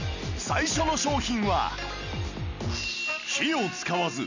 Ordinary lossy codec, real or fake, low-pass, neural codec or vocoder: none; real; 7.2 kHz; none